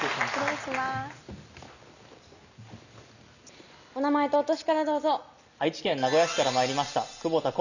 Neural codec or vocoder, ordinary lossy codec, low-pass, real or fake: none; none; 7.2 kHz; real